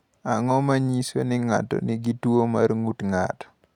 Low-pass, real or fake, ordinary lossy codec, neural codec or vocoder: 19.8 kHz; real; none; none